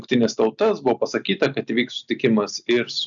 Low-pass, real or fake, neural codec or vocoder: 7.2 kHz; real; none